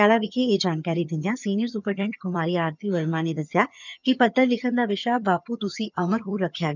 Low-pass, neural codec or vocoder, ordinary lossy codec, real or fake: 7.2 kHz; vocoder, 22.05 kHz, 80 mel bands, HiFi-GAN; none; fake